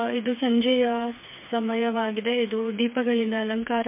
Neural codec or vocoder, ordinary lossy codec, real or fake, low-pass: codec, 16 kHz, 4 kbps, FreqCodec, smaller model; MP3, 32 kbps; fake; 3.6 kHz